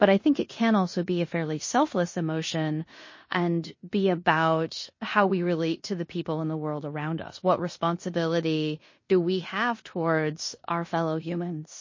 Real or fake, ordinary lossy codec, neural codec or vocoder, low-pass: fake; MP3, 32 kbps; codec, 16 kHz in and 24 kHz out, 0.9 kbps, LongCat-Audio-Codec, four codebook decoder; 7.2 kHz